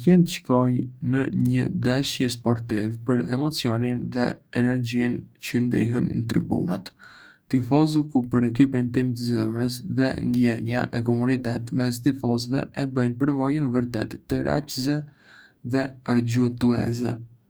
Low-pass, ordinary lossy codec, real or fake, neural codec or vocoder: none; none; fake; codec, 44.1 kHz, 2.6 kbps, DAC